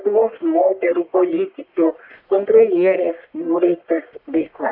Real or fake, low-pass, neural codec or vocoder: fake; 5.4 kHz; codec, 44.1 kHz, 1.7 kbps, Pupu-Codec